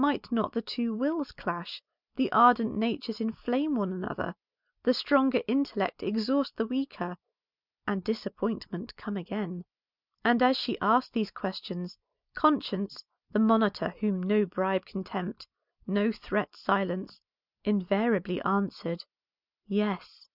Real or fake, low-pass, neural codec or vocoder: real; 5.4 kHz; none